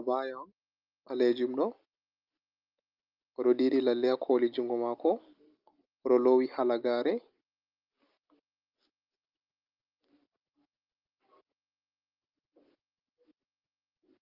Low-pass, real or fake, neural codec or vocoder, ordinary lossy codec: 5.4 kHz; real; none; Opus, 24 kbps